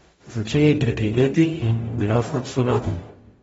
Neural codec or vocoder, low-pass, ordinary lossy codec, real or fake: codec, 44.1 kHz, 0.9 kbps, DAC; 19.8 kHz; AAC, 24 kbps; fake